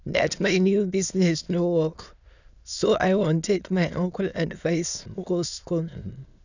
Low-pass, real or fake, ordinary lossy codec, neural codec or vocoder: 7.2 kHz; fake; none; autoencoder, 22.05 kHz, a latent of 192 numbers a frame, VITS, trained on many speakers